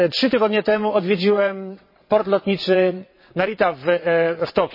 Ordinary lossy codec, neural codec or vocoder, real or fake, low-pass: MP3, 24 kbps; vocoder, 22.05 kHz, 80 mel bands, WaveNeXt; fake; 5.4 kHz